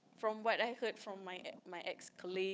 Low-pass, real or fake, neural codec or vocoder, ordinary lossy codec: none; fake; codec, 16 kHz, 8 kbps, FunCodec, trained on Chinese and English, 25 frames a second; none